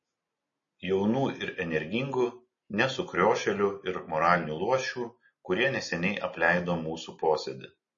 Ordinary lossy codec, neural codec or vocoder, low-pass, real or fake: MP3, 32 kbps; none; 7.2 kHz; real